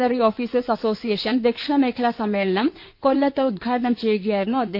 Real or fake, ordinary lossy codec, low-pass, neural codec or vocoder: fake; MP3, 32 kbps; 5.4 kHz; codec, 16 kHz in and 24 kHz out, 2.2 kbps, FireRedTTS-2 codec